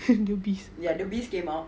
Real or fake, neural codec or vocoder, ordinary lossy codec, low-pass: real; none; none; none